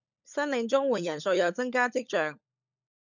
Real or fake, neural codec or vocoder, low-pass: fake; codec, 16 kHz, 16 kbps, FunCodec, trained on LibriTTS, 50 frames a second; 7.2 kHz